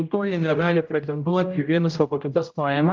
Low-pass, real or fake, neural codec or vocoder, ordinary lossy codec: 7.2 kHz; fake; codec, 16 kHz, 0.5 kbps, X-Codec, HuBERT features, trained on general audio; Opus, 24 kbps